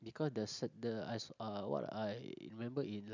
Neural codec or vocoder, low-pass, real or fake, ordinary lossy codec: none; 7.2 kHz; real; none